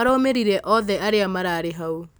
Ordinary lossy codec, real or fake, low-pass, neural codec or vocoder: none; real; none; none